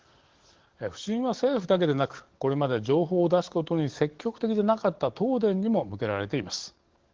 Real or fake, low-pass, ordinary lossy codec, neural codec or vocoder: fake; 7.2 kHz; Opus, 16 kbps; codec, 44.1 kHz, 7.8 kbps, DAC